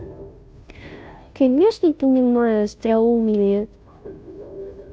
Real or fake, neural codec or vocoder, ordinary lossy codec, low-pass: fake; codec, 16 kHz, 0.5 kbps, FunCodec, trained on Chinese and English, 25 frames a second; none; none